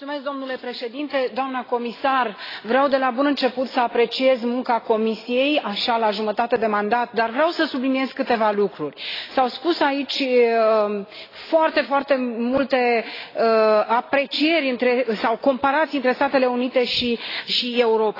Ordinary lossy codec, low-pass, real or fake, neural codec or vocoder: AAC, 24 kbps; 5.4 kHz; real; none